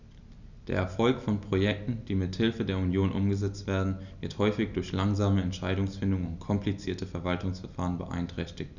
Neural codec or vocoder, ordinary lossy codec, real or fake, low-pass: none; none; real; 7.2 kHz